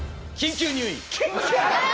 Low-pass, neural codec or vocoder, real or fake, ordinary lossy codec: none; none; real; none